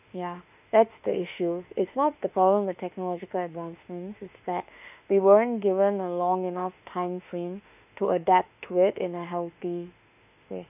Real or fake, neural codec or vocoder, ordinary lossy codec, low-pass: fake; autoencoder, 48 kHz, 32 numbers a frame, DAC-VAE, trained on Japanese speech; none; 3.6 kHz